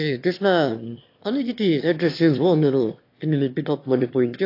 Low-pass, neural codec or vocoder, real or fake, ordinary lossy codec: 5.4 kHz; autoencoder, 22.05 kHz, a latent of 192 numbers a frame, VITS, trained on one speaker; fake; AAC, 32 kbps